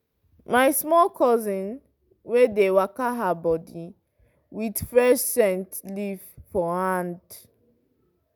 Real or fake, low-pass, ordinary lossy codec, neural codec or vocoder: real; none; none; none